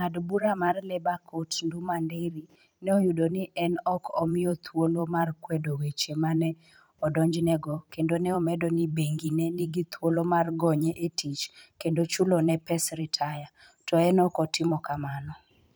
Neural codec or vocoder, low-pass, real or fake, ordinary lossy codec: vocoder, 44.1 kHz, 128 mel bands every 512 samples, BigVGAN v2; none; fake; none